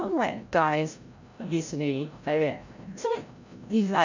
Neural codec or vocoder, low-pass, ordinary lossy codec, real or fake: codec, 16 kHz, 0.5 kbps, FreqCodec, larger model; 7.2 kHz; none; fake